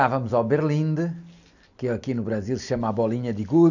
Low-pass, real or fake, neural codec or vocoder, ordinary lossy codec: 7.2 kHz; real; none; none